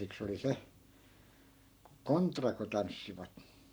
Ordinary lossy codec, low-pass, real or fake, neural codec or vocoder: none; none; fake; codec, 44.1 kHz, 7.8 kbps, Pupu-Codec